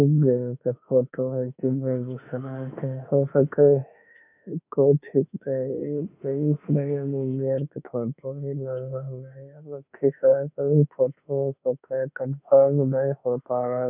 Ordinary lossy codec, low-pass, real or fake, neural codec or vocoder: none; 3.6 kHz; fake; autoencoder, 48 kHz, 32 numbers a frame, DAC-VAE, trained on Japanese speech